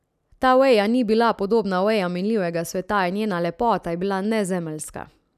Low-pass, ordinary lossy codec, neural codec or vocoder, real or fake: 14.4 kHz; none; none; real